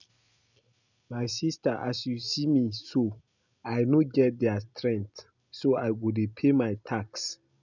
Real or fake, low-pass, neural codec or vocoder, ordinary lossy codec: real; 7.2 kHz; none; none